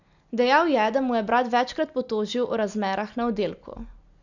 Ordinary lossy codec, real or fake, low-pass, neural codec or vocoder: none; real; 7.2 kHz; none